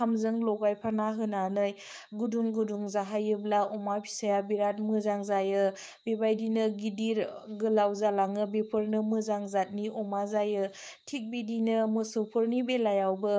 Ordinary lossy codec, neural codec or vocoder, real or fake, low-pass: none; codec, 16 kHz, 6 kbps, DAC; fake; none